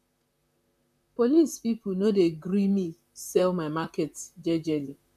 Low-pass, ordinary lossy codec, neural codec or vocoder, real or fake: 14.4 kHz; none; vocoder, 44.1 kHz, 128 mel bands, Pupu-Vocoder; fake